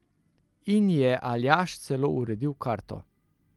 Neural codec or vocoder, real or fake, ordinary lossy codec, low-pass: none; real; Opus, 32 kbps; 19.8 kHz